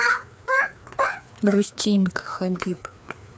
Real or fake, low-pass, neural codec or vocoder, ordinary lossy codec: fake; none; codec, 16 kHz, 2 kbps, FreqCodec, larger model; none